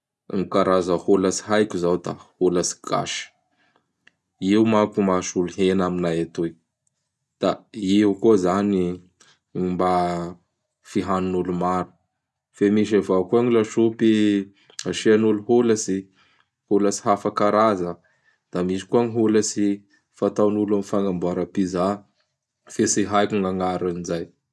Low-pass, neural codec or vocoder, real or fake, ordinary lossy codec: none; none; real; none